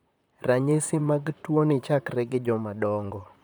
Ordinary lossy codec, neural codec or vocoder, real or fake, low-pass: none; vocoder, 44.1 kHz, 128 mel bands, Pupu-Vocoder; fake; none